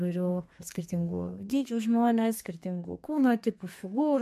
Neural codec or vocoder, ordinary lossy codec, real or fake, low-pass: codec, 32 kHz, 1.9 kbps, SNAC; MP3, 64 kbps; fake; 14.4 kHz